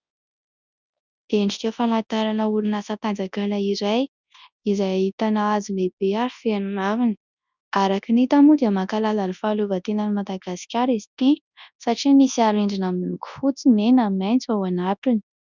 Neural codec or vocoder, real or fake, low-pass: codec, 24 kHz, 0.9 kbps, WavTokenizer, large speech release; fake; 7.2 kHz